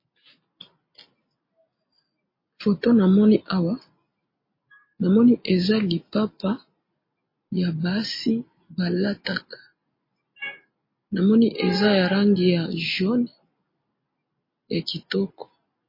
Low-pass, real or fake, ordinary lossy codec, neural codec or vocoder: 5.4 kHz; real; MP3, 24 kbps; none